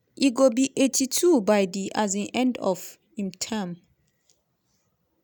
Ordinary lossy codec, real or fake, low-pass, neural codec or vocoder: none; real; none; none